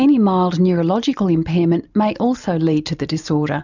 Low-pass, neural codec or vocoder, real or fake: 7.2 kHz; none; real